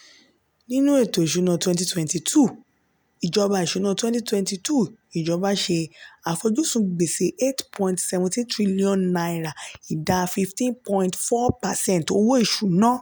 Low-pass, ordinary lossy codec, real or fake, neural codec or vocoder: none; none; real; none